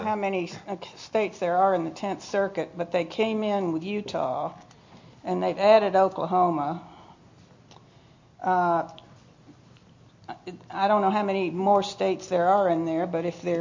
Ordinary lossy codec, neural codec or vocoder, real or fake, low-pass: MP3, 48 kbps; none; real; 7.2 kHz